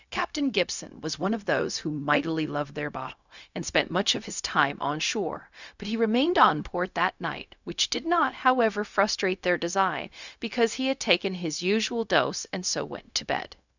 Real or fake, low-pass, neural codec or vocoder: fake; 7.2 kHz; codec, 16 kHz, 0.4 kbps, LongCat-Audio-Codec